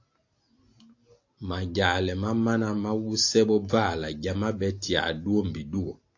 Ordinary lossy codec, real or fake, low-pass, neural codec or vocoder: AAC, 48 kbps; real; 7.2 kHz; none